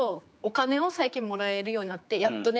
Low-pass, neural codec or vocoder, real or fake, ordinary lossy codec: none; codec, 16 kHz, 4 kbps, X-Codec, HuBERT features, trained on general audio; fake; none